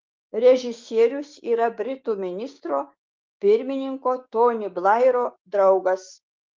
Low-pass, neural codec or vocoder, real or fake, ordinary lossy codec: 7.2 kHz; codec, 16 kHz, 6 kbps, DAC; fake; Opus, 32 kbps